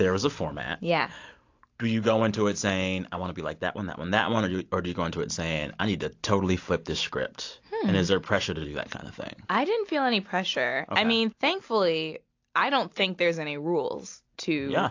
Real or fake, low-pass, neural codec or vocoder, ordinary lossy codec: real; 7.2 kHz; none; AAC, 48 kbps